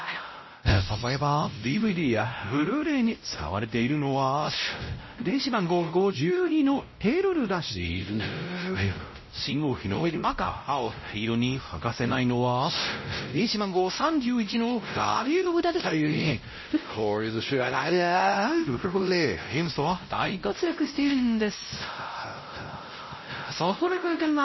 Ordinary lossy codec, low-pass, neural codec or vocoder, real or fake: MP3, 24 kbps; 7.2 kHz; codec, 16 kHz, 0.5 kbps, X-Codec, WavLM features, trained on Multilingual LibriSpeech; fake